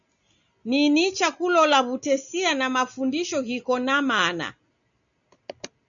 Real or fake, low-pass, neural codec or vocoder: real; 7.2 kHz; none